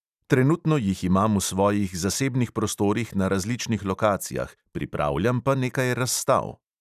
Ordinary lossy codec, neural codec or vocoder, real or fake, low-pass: none; none; real; 14.4 kHz